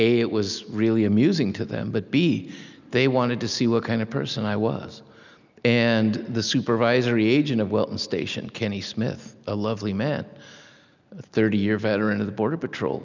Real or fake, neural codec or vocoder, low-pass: real; none; 7.2 kHz